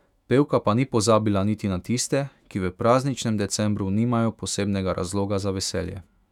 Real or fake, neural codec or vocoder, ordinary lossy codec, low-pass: fake; autoencoder, 48 kHz, 128 numbers a frame, DAC-VAE, trained on Japanese speech; none; 19.8 kHz